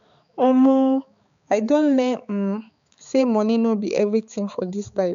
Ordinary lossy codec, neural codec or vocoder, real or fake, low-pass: none; codec, 16 kHz, 4 kbps, X-Codec, HuBERT features, trained on balanced general audio; fake; 7.2 kHz